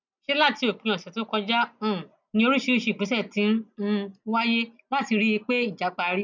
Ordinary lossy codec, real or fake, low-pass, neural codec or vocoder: none; real; 7.2 kHz; none